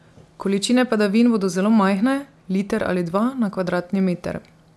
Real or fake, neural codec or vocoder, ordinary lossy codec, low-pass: real; none; none; none